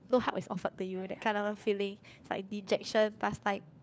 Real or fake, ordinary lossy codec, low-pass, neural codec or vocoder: fake; none; none; codec, 16 kHz, 4 kbps, FunCodec, trained on LibriTTS, 50 frames a second